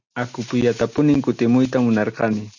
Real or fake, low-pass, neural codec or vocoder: real; 7.2 kHz; none